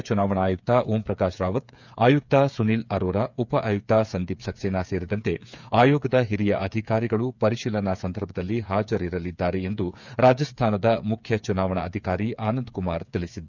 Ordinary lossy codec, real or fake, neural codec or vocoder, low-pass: none; fake; codec, 16 kHz, 8 kbps, FreqCodec, smaller model; 7.2 kHz